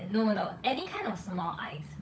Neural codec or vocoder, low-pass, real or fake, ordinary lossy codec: codec, 16 kHz, 16 kbps, FunCodec, trained on LibriTTS, 50 frames a second; none; fake; none